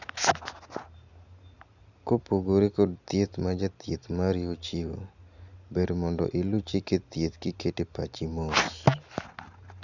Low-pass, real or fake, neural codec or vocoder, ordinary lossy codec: 7.2 kHz; real; none; none